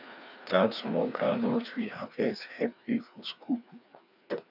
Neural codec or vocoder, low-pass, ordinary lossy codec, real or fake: codec, 16 kHz, 2 kbps, FreqCodec, larger model; 5.4 kHz; none; fake